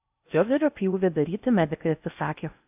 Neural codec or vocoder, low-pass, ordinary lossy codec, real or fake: codec, 16 kHz in and 24 kHz out, 0.6 kbps, FocalCodec, streaming, 4096 codes; 3.6 kHz; AAC, 32 kbps; fake